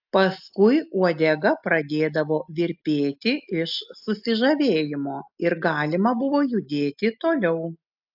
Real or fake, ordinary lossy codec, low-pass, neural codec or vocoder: real; AAC, 48 kbps; 5.4 kHz; none